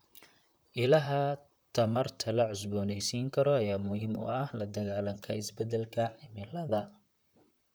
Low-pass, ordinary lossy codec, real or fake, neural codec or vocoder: none; none; fake; vocoder, 44.1 kHz, 128 mel bands, Pupu-Vocoder